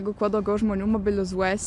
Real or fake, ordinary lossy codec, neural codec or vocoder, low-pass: real; MP3, 64 kbps; none; 10.8 kHz